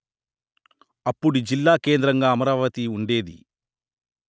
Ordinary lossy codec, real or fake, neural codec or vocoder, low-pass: none; real; none; none